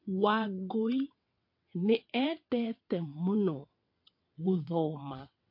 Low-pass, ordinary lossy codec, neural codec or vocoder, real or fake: 5.4 kHz; MP3, 32 kbps; vocoder, 22.05 kHz, 80 mel bands, WaveNeXt; fake